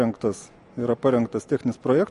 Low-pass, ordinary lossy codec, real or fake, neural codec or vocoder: 14.4 kHz; MP3, 48 kbps; real; none